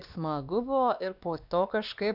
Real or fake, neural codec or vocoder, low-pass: fake; codec, 16 kHz, 2 kbps, X-Codec, WavLM features, trained on Multilingual LibriSpeech; 5.4 kHz